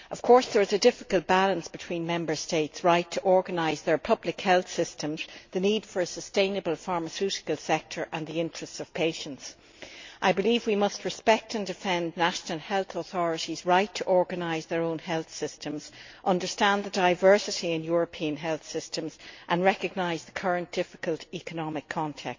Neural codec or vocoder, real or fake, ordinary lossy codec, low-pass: none; real; MP3, 48 kbps; 7.2 kHz